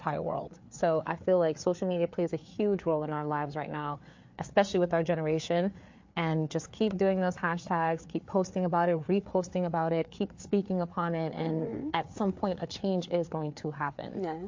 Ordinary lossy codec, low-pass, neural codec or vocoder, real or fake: MP3, 48 kbps; 7.2 kHz; codec, 16 kHz, 4 kbps, FreqCodec, larger model; fake